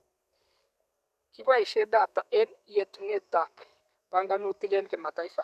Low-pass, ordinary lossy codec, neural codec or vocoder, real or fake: 14.4 kHz; none; codec, 32 kHz, 1.9 kbps, SNAC; fake